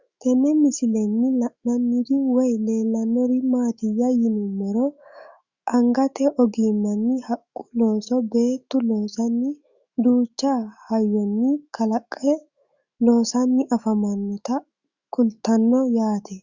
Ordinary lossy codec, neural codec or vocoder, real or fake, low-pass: Opus, 64 kbps; autoencoder, 48 kHz, 128 numbers a frame, DAC-VAE, trained on Japanese speech; fake; 7.2 kHz